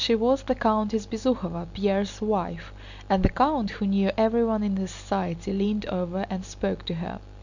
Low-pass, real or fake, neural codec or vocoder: 7.2 kHz; real; none